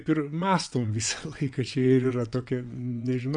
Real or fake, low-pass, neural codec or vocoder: fake; 9.9 kHz; vocoder, 22.05 kHz, 80 mel bands, WaveNeXt